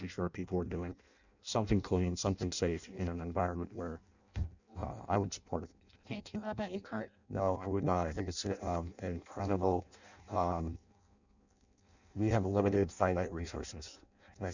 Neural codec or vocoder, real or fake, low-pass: codec, 16 kHz in and 24 kHz out, 0.6 kbps, FireRedTTS-2 codec; fake; 7.2 kHz